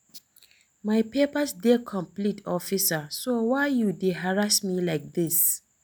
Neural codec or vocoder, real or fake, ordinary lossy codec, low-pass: none; real; none; none